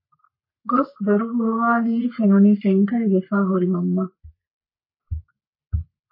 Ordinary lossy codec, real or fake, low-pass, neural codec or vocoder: MP3, 24 kbps; fake; 5.4 kHz; codec, 44.1 kHz, 3.4 kbps, Pupu-Codec